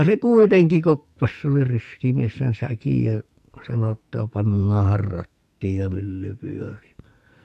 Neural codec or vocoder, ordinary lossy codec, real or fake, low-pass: codec, 44.1 kHz, 2.6 kbps, SNAC; none; fake; 14.4 kHz